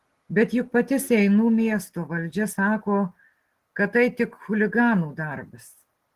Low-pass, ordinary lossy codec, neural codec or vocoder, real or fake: 14.4 kHz; Opus, 16 kbps; none; real